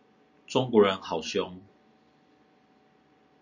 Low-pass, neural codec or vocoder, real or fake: 7.2 kHz; none; real